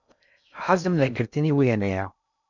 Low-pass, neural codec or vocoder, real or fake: 7.2 kHz; codec, 16 kHz in and 24 kHz out, 0.6 kbps, FocalCodec, streaming, 2048 codes; fake